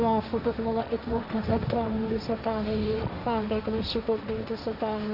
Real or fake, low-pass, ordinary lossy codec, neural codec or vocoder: fake; 5.4 kHz; none; codec, 16 kHz, 1.1 kbps, Voila-Tokenizer